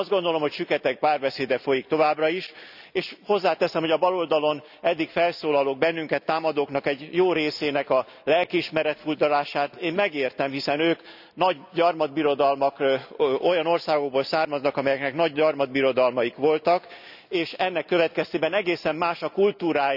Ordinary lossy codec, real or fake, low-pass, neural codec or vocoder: none; real; 5.4 kHz; none